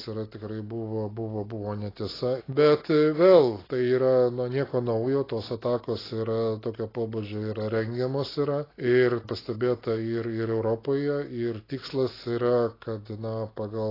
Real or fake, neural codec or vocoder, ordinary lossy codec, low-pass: real; none; AAC, 24 kbps; 5.4 kHz